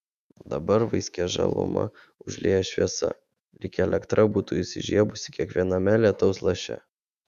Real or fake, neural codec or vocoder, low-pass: fake; autoencoder, 48 kHz, 128 numbers a frame, DAC-VAE, trained on Japanese speech; 14.4 kHz